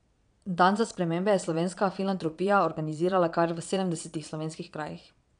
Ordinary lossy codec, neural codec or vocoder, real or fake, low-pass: none; vocoder, 22.05 kHz, 80 mel bands, Vocos; fake; 9.9 kHz